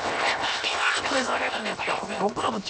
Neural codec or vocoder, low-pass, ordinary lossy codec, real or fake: codec, 16 kHz, 0.7 kbps, FocalCodec; none; none; fake